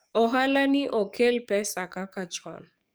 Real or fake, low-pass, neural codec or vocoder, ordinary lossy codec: fake; none; codec, 44.1 kHz, 7.8 kbps, DAC; none